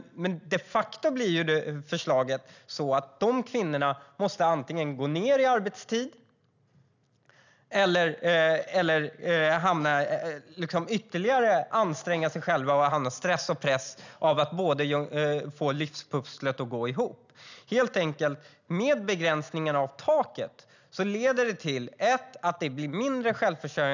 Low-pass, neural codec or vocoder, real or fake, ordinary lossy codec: 7.2 kHz; none; real; none